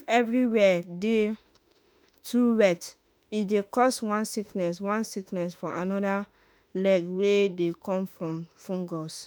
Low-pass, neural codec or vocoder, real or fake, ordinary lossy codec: none; autoencoder, 48 kHz, 32 numbers a frame, DAC-VAE, trained on Japanese speech; fake; none